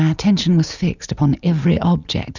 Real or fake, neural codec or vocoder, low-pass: real; none; 7.2 kHz